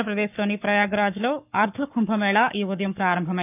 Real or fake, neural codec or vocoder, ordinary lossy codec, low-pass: fake; codec, 44.1 kHz, 7.8 kbps, Pupu-Codec; none; 3.6 kHz